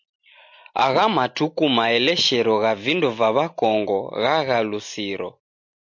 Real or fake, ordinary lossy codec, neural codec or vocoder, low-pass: real; MP3, 48 kbps; none; 7.2 kHz